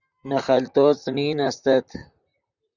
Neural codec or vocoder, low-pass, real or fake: vocoder, 44.1 kHz, 128 mel bands, Pupu-Vocoder; 7.2 kHz; fake